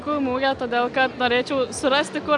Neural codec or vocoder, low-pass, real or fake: none; 10.8 kHz; real